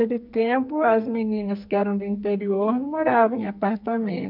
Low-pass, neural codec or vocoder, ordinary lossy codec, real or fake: 5.4 kHz; codec, 44.1 kHz, 2.6 kbps, SNAC; none; fake